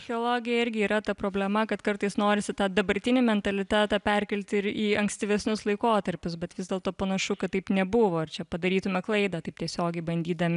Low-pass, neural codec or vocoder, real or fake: 10.8 kHz; none; real